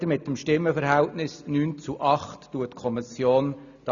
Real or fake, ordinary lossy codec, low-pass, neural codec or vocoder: real; none; 7.2 kHz; none